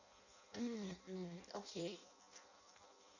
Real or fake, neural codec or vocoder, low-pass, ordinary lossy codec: fake; codec, 16 kHz in and 24 kHz out, 0.6 kbps, FireRedTTS-2 codec; 7.2 kHz; none